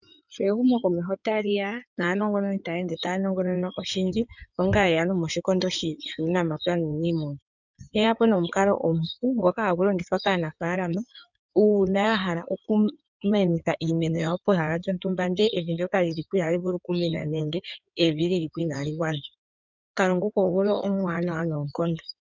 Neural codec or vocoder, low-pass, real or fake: codec, 16 kHz in and 24 kHz out, 2.2 kbps, FireRedTTS-2 codec; 7.2 kHz; fake